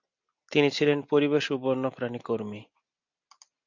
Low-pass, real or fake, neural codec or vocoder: 7.2 kHz; real; none